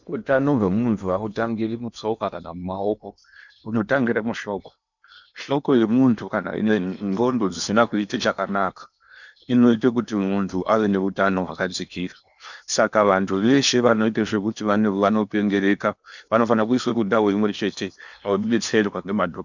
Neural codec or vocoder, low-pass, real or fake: codec, 16 kHz in and 24 kHz out, 0.8 kbps, FocalCodec, streaming, 65536 codes; 7.2 kHz; fake